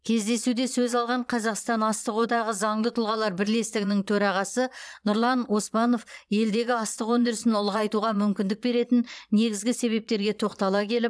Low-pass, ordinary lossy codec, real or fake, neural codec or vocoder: none; none; fake; vocoder, 22.05 kHz, 80 mel bands, Vocos